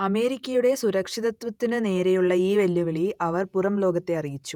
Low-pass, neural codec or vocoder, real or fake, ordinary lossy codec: 19.8 kHz; vocoder, 48 kHz, 128 mel bands, Vocos; fake; none